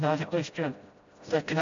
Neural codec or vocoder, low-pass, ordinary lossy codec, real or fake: codec, 16 kHz, 0.5 kbps, FreqCodec, smaller model; 7.2 kHz; AAC, 48 kbps; fake